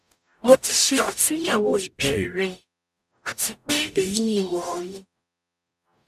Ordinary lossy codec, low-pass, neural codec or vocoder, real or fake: none; 14.4 kHz; codec, 44.1 kHz, 0.9 kbps, DAC; fake